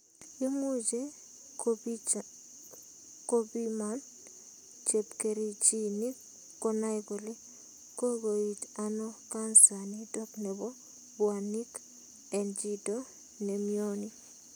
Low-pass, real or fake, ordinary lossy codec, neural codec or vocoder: none; real; none; none